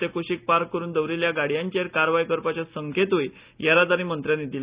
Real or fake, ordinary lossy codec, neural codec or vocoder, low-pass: real; Opus, 64 kbps; none; 3.6 kHz